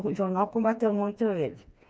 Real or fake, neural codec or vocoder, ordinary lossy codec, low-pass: fake; codec, 16 kHz, 2 kbps, FreqCodec, smaller model; none; none